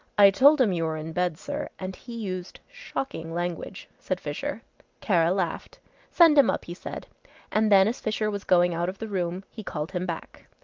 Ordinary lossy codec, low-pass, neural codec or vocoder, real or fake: Opus, 32 kbps; 7.2 kHz; none; real